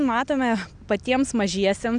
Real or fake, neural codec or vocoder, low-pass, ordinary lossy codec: real; none; 9.9 kHz; MP3, 96 kbps